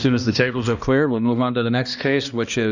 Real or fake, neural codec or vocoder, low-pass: fake; codec, 16 kHz, 1 kbps, X-Codec, HuBERT features, trained on balanced general audio; 7.2 kHz